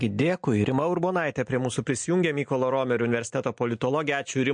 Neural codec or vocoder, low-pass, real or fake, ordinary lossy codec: none; 9.9 kHz; real; MP3, 48 kbps